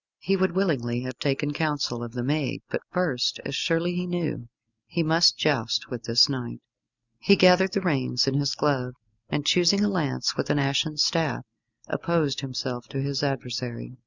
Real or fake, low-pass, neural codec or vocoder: real; 7.2 kHz; none